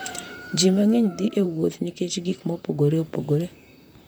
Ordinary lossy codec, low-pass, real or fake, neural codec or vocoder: none; none; fake; vocoder, 44.1 kHz, 128 mel bands, Pupu-Vocoder